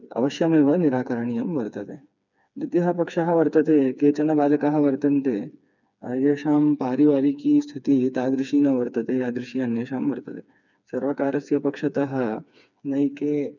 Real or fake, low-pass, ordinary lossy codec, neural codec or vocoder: fake; 7.2 kHz; none; codec, 16 kHz, 4 kbps, FreqCodec, smaller model